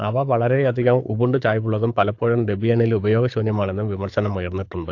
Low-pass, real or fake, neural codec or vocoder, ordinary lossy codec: 7.2 kHz; fake; codec, 24 kHz, 6 kbps, HILCodec; AAC, 48 kbps